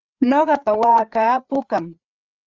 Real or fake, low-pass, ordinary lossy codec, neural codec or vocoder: fake; 7.2 kHz; Opus, 32 kbps; codec, 16 kHz, 8 kbps, FreqCodec, larger model